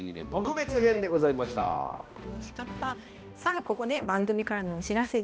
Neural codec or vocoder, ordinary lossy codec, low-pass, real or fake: codec, 16 kHz, 1 kbps, X-Codec, HuBERT features, trained on balanced general audio; none; none; fake